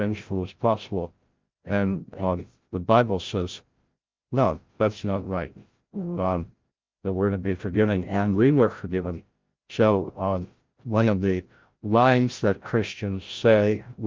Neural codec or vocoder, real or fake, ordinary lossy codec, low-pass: codec, 16 kHz, 0.5 kbps, FreqCodec, larger model; fake; Opus, 32 kbps; 7.2 kHz